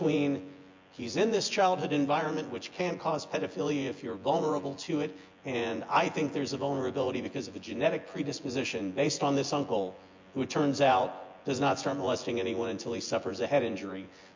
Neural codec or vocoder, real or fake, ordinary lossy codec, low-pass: vocoder, 24 kHz, 100 mel bands, Vocos; fake; MP3, 48 kbps; 7.2 kHz